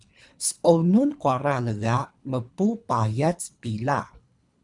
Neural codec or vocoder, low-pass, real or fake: codec, 24 kHz, 3 kbps, HILCodec; 10.8 kHz; fake